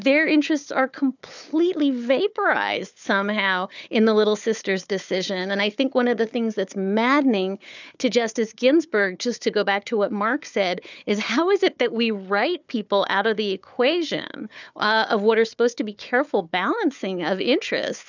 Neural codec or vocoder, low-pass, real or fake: codec, 16 kHz, 4 kbps, FunCodec, trained on Chinese and English, 50 frames a second; 7.2 kHz; fake